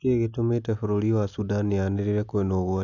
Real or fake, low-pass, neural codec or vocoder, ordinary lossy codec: real; 7.2 kHz; none; none